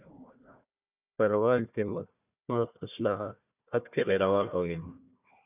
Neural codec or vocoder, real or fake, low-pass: codec, 16 kHz, 1 kbps, FunCodec, trained on Chinese and English, 50 frames a second; fake; 3.6 kHz